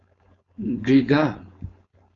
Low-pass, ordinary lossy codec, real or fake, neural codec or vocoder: 7.2 kHz; AAC, 32 kbps; fake; codec, 16 kHz, 4.8 kbps, FACodec